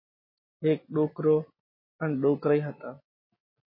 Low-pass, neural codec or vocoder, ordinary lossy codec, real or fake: 5.4 kHz; none; MP3, 24 kbps; real